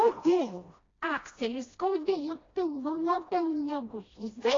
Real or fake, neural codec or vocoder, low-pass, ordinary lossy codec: fake; codec, 16 kHz, 1 kbps, FreqCodec, smaller model; 7.2 kHz; AAC, 32 kbps